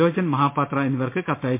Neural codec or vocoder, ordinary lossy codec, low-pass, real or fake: none; MP3, 24 kbps; 3.6 kHz; real